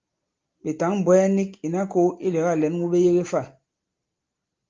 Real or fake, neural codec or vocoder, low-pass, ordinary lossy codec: real; none; 7.2 kHz; Opus, 24 kbps